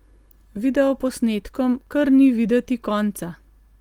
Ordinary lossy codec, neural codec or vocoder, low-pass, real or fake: Opus, 24 kbps; none; 19.8 kHz; real